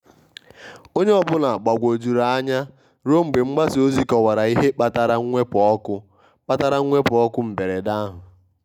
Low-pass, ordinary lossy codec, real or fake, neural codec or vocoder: 19.8 kHz; none; real; none